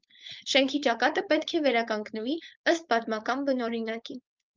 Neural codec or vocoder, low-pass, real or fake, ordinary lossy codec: codec, 16 kHz, 4.8 kbps, FACodec; 7.2 kHz; fake; Opus, 24 kbps